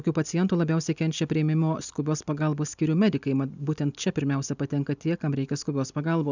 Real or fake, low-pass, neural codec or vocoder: real; 7.2 kHz; none